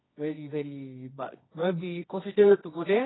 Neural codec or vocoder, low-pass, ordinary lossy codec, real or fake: codec, 24 kHz, 0.9 kbps, WavTokenizer, medium music audio release; 7.2 kHz; AAC, 16 kbps; fake